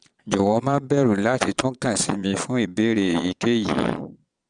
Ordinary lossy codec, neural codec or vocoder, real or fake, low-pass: none; vocoder, 22.05 kHz, 80 mel bands, Vocos; fake; 9.9 kHz